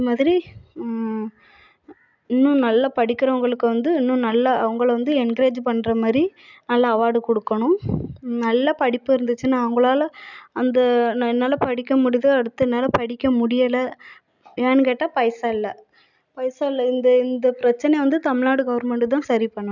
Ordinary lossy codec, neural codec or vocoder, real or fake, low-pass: none; none; real; 7.2 kHz